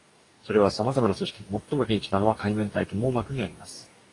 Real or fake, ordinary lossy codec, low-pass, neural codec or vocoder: fake; AAC, 32 kbps; 10.8 kHz; codec, 44.1 kHz, 2.6 kbps, DAC